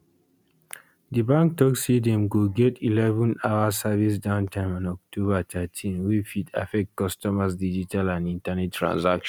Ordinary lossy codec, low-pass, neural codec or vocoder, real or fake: none; 19.8 kHz; none; real